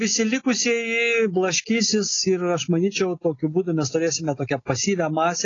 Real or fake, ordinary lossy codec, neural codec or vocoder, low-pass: real; AAC, 32 kbps; none; 7.2 kHz